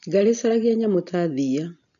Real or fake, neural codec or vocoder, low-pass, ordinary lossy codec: real; none; 7.2 kHz; none